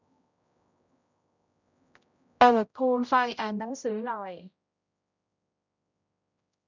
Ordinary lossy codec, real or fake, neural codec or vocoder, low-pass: none; fake; codec, 16 kHz, 0.5 kbps, X-Codec, HuBERT features, trained on general audio; 7.2 kHz